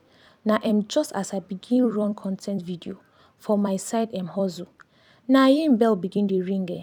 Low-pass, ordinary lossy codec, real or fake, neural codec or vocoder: 19.8 kHz; none; fake; vocoder, 44.1 kHz, 128 mel bands every 256 samples, BigVGAN v2